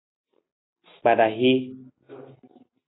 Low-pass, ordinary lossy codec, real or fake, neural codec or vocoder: 7.2 kHz; AAC, 16 kbps; real; none